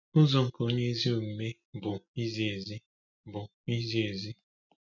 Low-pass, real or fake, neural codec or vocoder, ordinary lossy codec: 7.2 kHz; real; none; none